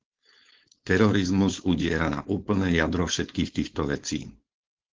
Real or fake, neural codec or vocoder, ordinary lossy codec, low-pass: fake; codec, 16 kHz, 4.8 kbps, FACodec; Opus, 16 kbps; 7.2 kHz